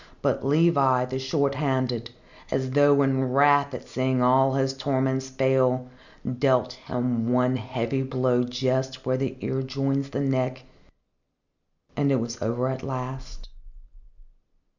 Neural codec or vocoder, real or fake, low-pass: none; real; 7.2 kHz